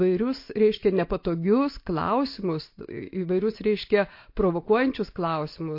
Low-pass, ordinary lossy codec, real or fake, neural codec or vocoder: 5.4 kHz; MP3, 32 kbps; real; none